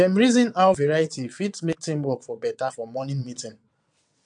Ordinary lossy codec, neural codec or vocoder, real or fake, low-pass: MP3, 64 kbps; vocoder, 22.05 kHz, 80 mel bands, WaveNeXt; fake; 9.9 kHz